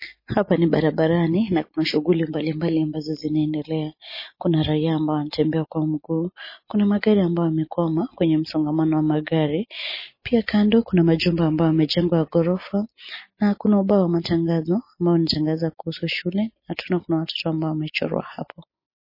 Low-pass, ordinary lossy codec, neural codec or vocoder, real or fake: 5.4 kHz; MP3, 24 kbps; none; real